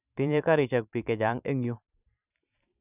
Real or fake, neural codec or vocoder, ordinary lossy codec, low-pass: fake; vocoder, 44.1 kHz, 128 mel bands every 512 samples, BigVGAN v2; none; 3.6 kHz